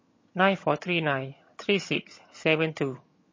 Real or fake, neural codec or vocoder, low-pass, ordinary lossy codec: fake; vocoder, 22.05 kHz, 80 mel bands, HiFi-GAN; 7.2 kHz; MP3, 32 kbps